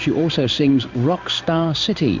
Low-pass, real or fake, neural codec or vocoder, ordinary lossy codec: 7.2 kHz; fake; codec, 16 kHz in and 24 kHz out, 1 kbps, XY-Tokenizer; Opus, 64 kbps